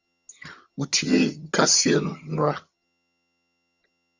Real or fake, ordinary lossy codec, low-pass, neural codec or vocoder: fake; Opus, 64 kbps; 7.2 kHz; vocoder, 22.05 kHz, 80 mel bands, HiFi-GAN